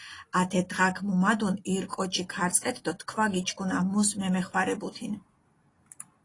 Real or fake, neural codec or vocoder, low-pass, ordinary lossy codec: real; none; 10.8 kHz; AAC, 32 kbps